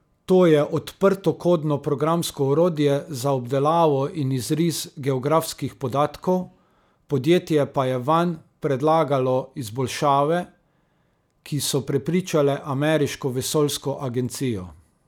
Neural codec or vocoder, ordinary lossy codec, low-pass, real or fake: none; none; 19.8 kHz; real